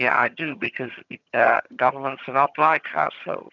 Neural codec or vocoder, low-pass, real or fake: vocoder, 22.05 kHz, 80 mel bands, HiFi-GAN; 7.2 kHz; fake